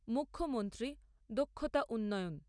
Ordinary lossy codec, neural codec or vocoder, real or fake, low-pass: MP3, 96 kbps; none; real; 9.9 kHz